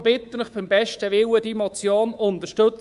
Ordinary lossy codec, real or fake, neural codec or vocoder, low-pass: Opus, 64 kbps; fake; codec, 24 kHz, 3.1 kbps, DualCodec; 10.8 kHz